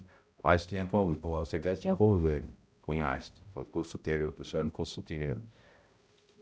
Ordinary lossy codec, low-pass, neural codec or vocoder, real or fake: none; none; codec, 16 kHz, 0.5 kbps, X-Codec, HuBERT features, trained on balanced general audio; fake